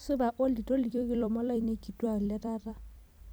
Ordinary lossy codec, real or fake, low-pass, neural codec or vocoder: none; fake; none; vocoder, 44.1 kHz, 128 mel bands every 256 samples, BigVGAN v2